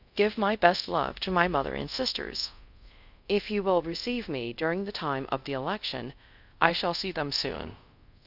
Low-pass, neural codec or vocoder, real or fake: 5.4 kHz; codec, 24 kHz, 0.5 kbps, DualCodec; fake